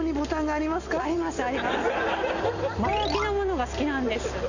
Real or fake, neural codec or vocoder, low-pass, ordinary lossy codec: real; none; 7.2 kHz; none